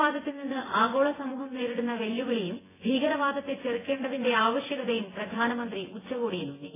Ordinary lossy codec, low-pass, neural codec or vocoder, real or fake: AAC, 16 kbps; 3.6 kHz; vocoder, 24 kHz, 100 mel bands, Vocos; fake